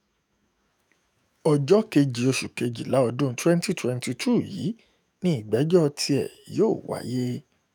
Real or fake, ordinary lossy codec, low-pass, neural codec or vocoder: fake; none; none; autoencoder, 48 kHz, 128 numbers a frame, DAC-VAE, trained on Japanese speech